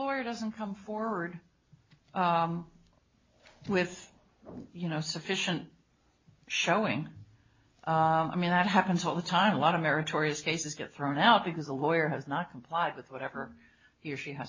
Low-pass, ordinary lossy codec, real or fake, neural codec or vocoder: 7.2 kHz; MP3, 32 kbps; real; none